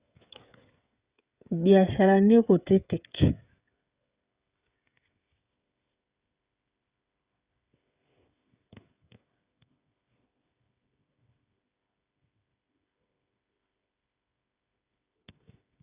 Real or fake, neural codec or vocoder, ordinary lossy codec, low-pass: fake; codec, 16 kHz, 16 kbps, FreqCodec, smaller model; Opus, 64 kbps; 3.6 kHz